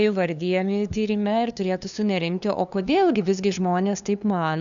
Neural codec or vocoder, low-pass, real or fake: codec, 16 kHz, 2 kbps, FunCodec, trained on LibriTTS, 25 frames a second; 7.2 kHz; fake